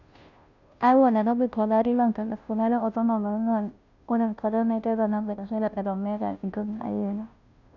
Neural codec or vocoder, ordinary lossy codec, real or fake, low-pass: codec, 16 kHz, 0.5 kbps, FunCodec, trained on Chinese and English, 25 frames a second; none; fake; 7.2 kHz